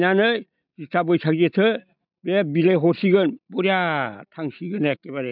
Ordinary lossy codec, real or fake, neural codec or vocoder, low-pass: none; real; none; 5.4 kHz